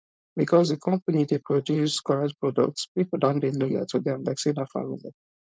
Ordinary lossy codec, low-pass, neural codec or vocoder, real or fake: none; none; codec, 16 kHz, 4.8 kbps, FACodec; fake